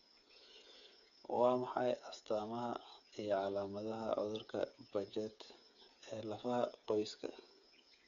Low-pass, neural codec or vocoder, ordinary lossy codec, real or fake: 7.2 kHz; codec, 16 kHz, 8 kbps, FreqCodec, smaller model; none; fake